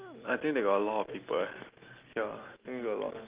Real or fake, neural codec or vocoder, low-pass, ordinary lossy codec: real; none; 3.6 kHz; Opus, 32 kbps